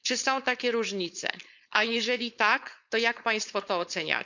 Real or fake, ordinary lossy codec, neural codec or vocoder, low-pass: fake; none; codec, 16 kHz, 4.8 kbps, FACodec; 7.2 kHz